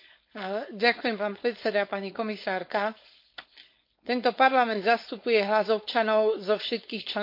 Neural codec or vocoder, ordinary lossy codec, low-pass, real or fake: codec, 16 kHz, 4.8 kbps, FACodec; MP3, 32 kbps; 5.4 kHz; fake